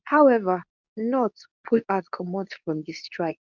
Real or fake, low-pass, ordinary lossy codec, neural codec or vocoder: fake; 7.2 kHz; none; codec, 24 kHz, 0.9 kbps, WavTokenizer, medium speech release version 2